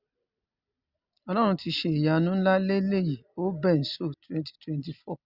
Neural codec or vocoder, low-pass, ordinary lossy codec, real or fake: none; 5.4 kHz; Opus, 64 kbps; real